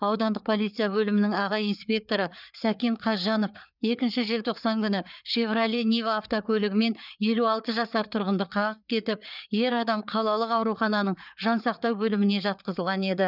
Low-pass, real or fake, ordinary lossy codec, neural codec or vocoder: 5.4 kHz; fake; none; codec, 16 kHz, 4 kbps, FreqCodec, larger model